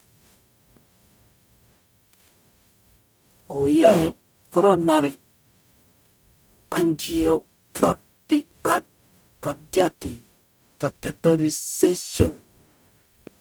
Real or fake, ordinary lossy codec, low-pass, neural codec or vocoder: fake; none; none; codec, 44.1 kHz, 0.9 kbps, DAC